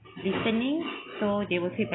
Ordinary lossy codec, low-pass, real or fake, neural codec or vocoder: AAC, 16 kbps; 7.2 kHz; real; none